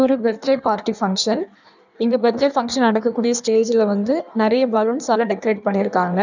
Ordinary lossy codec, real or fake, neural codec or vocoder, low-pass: none; fake; codec, 16 kHz in and 24 kHz out, 1.1 kbps, FireRedTTS-2 codec; 7.2 kHz